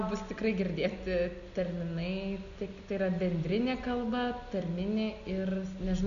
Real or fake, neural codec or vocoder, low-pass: real; none; 7.2 kHz